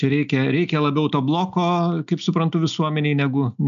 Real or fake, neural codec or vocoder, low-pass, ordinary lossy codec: real; none; 7.2 kHz; AAC, 96 kbps